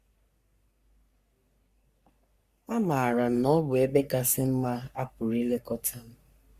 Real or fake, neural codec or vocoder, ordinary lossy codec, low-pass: fake; codec, 44.1 kHz, 3.4 kbps, Pupu-Codec; Opus, 64 kbps; 14.4 kHz